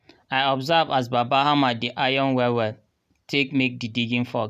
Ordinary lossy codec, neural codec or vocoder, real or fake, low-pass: none; none; real; 14.4 kHz